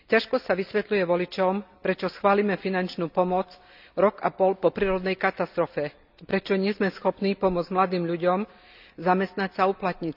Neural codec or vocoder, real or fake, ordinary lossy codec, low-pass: none; real; none; 5.4 kHz